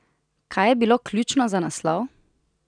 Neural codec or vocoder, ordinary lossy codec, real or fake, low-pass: none; none; real; 9.9 kHz